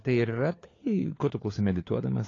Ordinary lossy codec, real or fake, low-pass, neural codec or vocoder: AAC, 32 kbps; fake; 7.2 kHz; codec, 16 kHz, 4 kbps, FunCodec, trained on LibriTTS, 50 frames a second